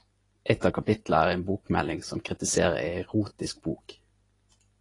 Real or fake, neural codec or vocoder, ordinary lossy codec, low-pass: real; none; AAC, 32 kbps; 10.8 kHz